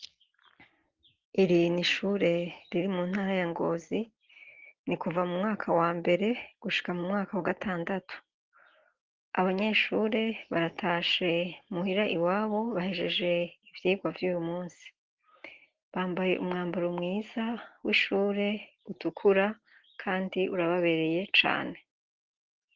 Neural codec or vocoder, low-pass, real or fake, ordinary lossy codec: none; 7.2 kHz; real; Opus, 16 kbps